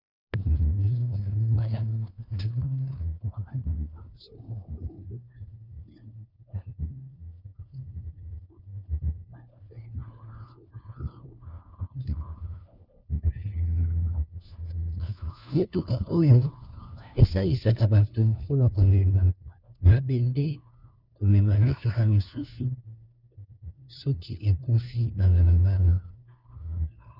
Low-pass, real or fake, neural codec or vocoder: 5.4 kHz; fake; codec, 16 kHz, 1 kbps, FunCodec, trained on LibriTTS, 50 frames a second